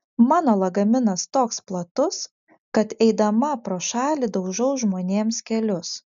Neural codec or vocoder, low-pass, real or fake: none; 7.2 kHz; real